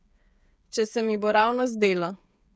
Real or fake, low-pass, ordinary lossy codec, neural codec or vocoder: fake; none; none; codec, 16 kHz, 8 kbps, FreqCodec, smaller model